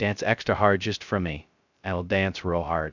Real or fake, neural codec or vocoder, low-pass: fake; codec, 16 kHz, 0.2 kbps, FocalCodec; 7.2 kHz